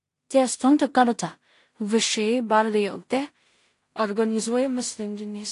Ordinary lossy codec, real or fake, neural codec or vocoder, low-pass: AAC, 48 kbps; fake; codec, 16 kHz in and 24 kHz out, 0.4 kbps, LongCat-Audio-Codec, two codebook decoder; 10.8 kHz